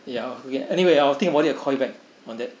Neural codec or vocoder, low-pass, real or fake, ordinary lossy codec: none; none; real; none